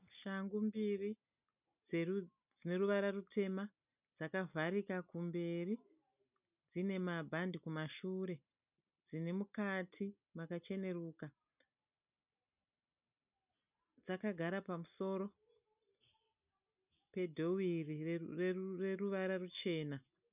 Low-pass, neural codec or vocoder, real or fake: 3.6 kHz; none; real